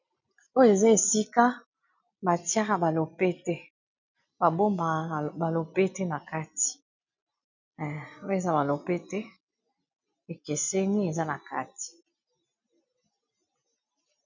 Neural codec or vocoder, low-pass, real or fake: none; 7.2 kHz; real